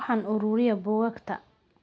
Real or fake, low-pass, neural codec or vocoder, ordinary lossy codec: real; none; none; none